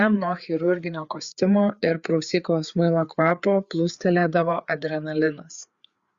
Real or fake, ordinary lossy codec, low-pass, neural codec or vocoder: fake; Opus, 64 kbps; 7.2 kHz; codec, 16 kHz, 4 kbps, FreqCodec, larger model